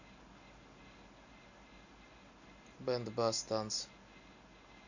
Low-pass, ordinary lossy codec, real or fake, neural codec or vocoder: 7.2 kHz; none; real; none